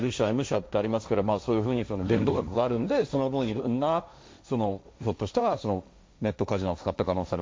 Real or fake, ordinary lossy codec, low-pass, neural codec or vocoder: fake; none; none; codec, 16 kHz, 1.1 kbps, Voila-Tokenizer